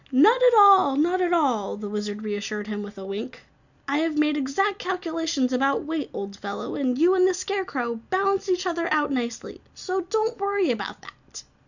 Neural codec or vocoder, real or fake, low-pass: none; real; 7.2 kHz